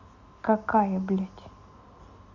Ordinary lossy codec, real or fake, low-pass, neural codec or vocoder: Opus, 64 kbps; real; 7.2 kHz; none